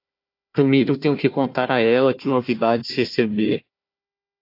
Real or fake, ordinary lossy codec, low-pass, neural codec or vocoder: fake; AAC, 32 kbps; 5.4 kHz; codec, 16 kHz, 1 kbps, FunCodec, trained on Chinese and English, 50 frames a second